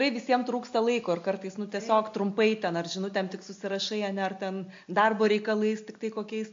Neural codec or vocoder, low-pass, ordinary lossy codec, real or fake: none; 7.2 kHz; MP3, 48 kbps; real